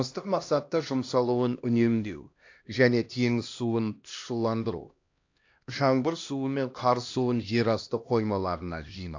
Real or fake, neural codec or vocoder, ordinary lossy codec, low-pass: fake; codec, 16 kHz, 1 kbps, X-Codec, HuBERT features, trained on LibriSpeech; AAC, 48 kbps; 7.2 kHz